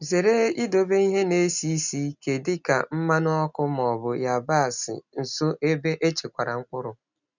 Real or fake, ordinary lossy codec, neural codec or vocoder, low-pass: real; none; none; 7.2 kHz